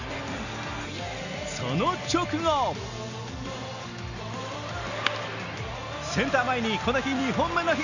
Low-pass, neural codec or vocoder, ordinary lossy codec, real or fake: 7.2 kHz; none; none; real